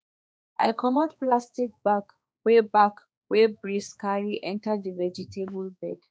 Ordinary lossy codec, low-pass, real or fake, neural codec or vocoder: none; none; fake; codec, 16 kHz, 2 kbps, X-Codec, HuBERT features, trained on balanced general audio